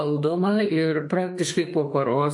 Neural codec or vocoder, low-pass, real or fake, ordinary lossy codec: autoencoder, 48 kHz, 32 numbers a frame, DAC-VAE, trained on Japanese speech; 10.8 kHz; fake; MP3, 48 kbps